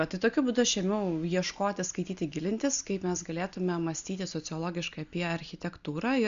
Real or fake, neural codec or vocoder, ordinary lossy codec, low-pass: real; none; Opus, 64 kbps; 7.2 kHz